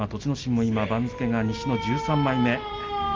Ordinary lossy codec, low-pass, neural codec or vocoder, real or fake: Opus, 32 kbps; 7.2 kHz; none; real